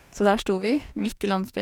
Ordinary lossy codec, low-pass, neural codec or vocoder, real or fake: none; 19.8 kHz; codec, 44.1 kHz, 2.6 kbps, DAC; fake